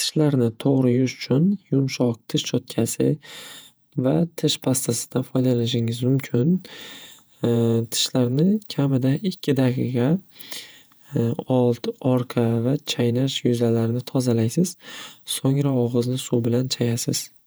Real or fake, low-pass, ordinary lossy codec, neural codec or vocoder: fake; none; none; vocoder, 48 kHz, 128 mel bands, Vocos